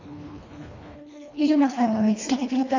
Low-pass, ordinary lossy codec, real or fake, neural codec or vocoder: 7.2 kHz; AAC, 48 kbps; fake; codec, 24 kHz, 1.5 kbps, HILCodec